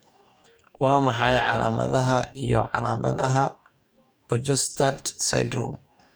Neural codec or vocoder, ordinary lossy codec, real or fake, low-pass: codec, 44.1 kHz, 2.6 kbps, DAC; none; fake; none